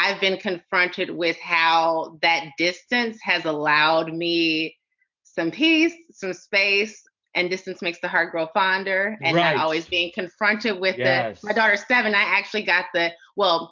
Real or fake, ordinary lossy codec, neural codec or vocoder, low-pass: real; MP3, 64 kbps; none; 7.2 kHz